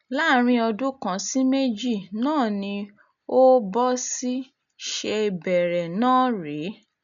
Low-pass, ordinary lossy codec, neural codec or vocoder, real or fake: 7.2 kHz; none; none; real